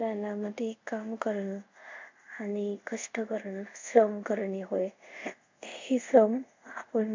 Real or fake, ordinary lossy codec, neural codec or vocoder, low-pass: fake; MP3, 64 kbps; codec, 24 kHz, 0.5 kbps, DualCodec; 7.2 kHz